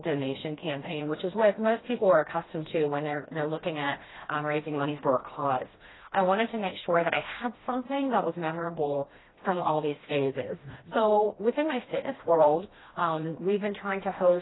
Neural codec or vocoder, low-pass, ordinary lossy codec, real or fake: codec, 16 kHz, 1 kbps, FreqCodec, smaller model; 7.2 kHz; AAC, 16 kbps; fake